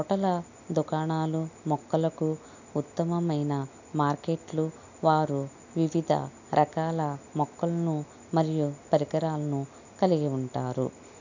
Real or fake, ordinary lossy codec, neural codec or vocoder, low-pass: real; none; none; 7.2 kHz